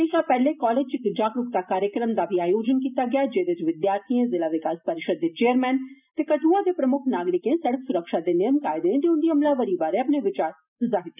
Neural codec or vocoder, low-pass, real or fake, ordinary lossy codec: none; 3.6 kHz; real; none